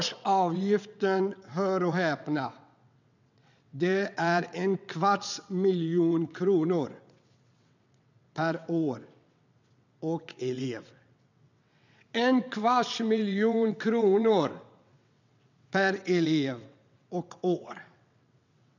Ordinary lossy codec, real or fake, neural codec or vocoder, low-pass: none; fake; vocoder, 22.05 kHz, 80 mel bands, WaveNeXt; 7.2 kHz